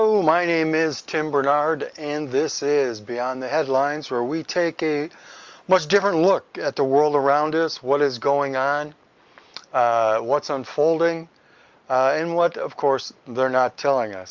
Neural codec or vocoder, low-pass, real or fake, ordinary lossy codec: none; 7.2 kHz; real; Opus, 32 kbps